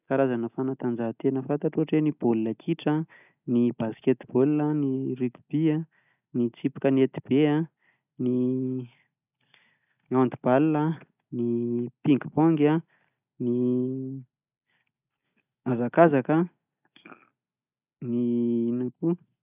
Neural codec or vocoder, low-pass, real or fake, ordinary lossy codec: none; 3.6 kHz; real; none